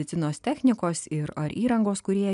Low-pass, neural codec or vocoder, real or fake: 10.8 kHz; none; real